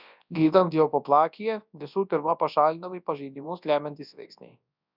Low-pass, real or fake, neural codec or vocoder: 5.4 kHz; fake; codec, 24 kHz, 0.9 kbps, WavTokenizer, large speech release